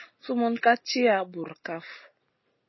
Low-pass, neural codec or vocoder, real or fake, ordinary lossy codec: 7.2 kHz; none; real; MP3, 24 kbps